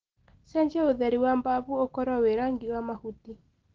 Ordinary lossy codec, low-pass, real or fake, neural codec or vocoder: Opus, 16 kbps; 7.2 kHz; real; none